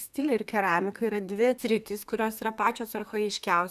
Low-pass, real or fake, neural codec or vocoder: 14.4 kHz; fake; codec, 32 kHz, 1.9 kbps, SNAC